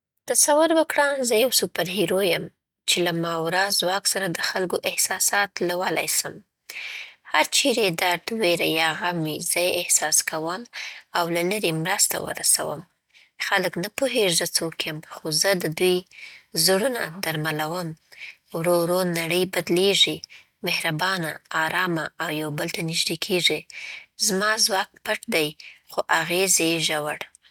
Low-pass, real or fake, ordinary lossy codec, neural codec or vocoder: 19.8 kHz; real; none; none